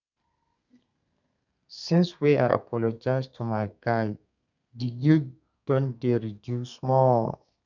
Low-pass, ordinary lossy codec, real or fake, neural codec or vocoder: 7.2 kHz; none; fake; codec, 32 kHz, 1.9 kbps, SNAC